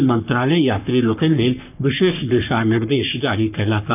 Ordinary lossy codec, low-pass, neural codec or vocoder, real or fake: none; 3.6 kHz; codec, 44.1 kHz, 3.4 kbps, Pupu-Codec; fake